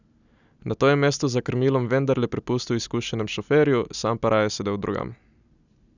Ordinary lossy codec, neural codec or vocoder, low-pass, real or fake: none; none; 7.2 kHz; real